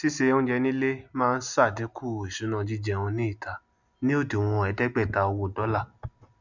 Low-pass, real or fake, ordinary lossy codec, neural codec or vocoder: 7.2 kHz; real; none; none